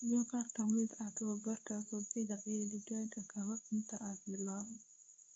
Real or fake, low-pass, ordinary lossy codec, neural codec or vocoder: fake; none; none; codec, 24 kHz, 0.9 kbps, WavTokenizer, medium speech release version 2